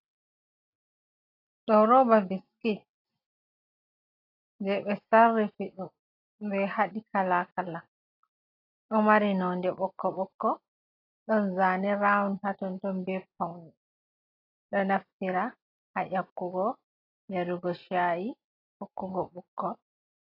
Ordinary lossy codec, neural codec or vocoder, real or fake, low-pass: AAC, 32 kbps; none; real; 5.4 kHz